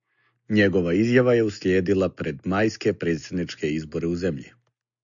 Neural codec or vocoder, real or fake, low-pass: none; real; 7.2 kHz